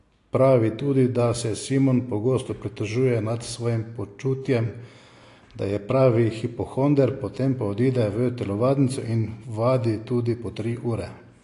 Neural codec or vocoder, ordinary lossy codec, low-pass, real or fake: none; AAC, 48 kbps; 10.8 kHz; real